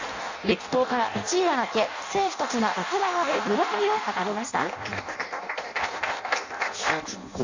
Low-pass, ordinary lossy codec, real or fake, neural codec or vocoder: 7.2 kHz; Opus, 64 kbps; fake; codec, 16 kHz in and 24 kHz out, 0.6 kbps, FireRedTTS-2 codec